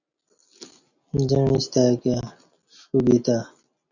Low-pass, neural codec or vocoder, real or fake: 7.2 kHz; none; real